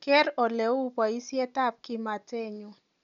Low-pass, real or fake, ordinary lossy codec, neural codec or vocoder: 7.2 kHz; real; MP3, 96 kbps; none